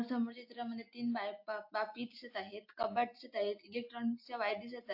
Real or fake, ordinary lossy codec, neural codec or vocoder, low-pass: real; AAC, 48 kbps; none; 5.4 kHz